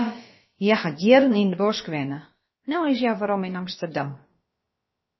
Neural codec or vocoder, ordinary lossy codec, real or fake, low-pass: codec, 16 kHz, about 1 kbps, DyCAST, with the encoder's durations; MP3, 24 kbps; fake; 7.2 kHz